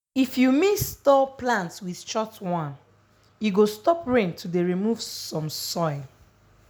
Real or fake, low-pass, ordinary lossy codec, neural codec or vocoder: real; none; none; none